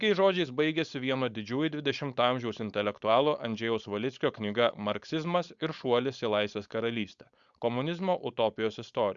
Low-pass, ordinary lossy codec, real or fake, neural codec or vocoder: 7.2 kHz; Opus, 64 kbps; fake; codec, 16 kHz, 4.8 kbps, FACodec